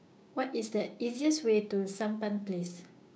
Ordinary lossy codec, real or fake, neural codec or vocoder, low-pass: none; fake; codec, 16 kHz, 6 kbps, DAC; none